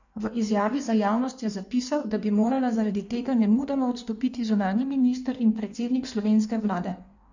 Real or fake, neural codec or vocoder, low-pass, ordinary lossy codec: fake; codec, 16 kHz in and 24 kHz out, 1.1 kbps, FireRedTTS-2 codec; 7.2 kHz; none